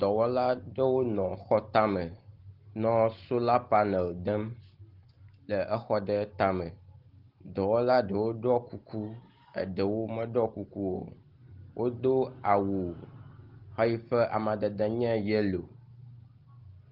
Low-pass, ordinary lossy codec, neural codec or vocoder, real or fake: 5.4 kHz; Opus, 16 kbps; none; real